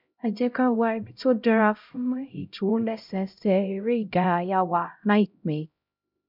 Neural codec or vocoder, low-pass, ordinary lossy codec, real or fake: codec, 16 kHz, 0.5 kbps, X-Codec, HuBERT features, trained on LibriSpeech; 5.4 kHz; none; fake